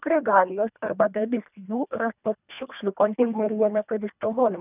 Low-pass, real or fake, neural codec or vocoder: 3.6 kHz; fake; codec, 24 kHz, 1.5 kbps, HILCodec